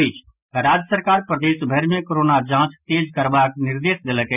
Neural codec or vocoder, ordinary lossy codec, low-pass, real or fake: none; none; 3.6 kHz; real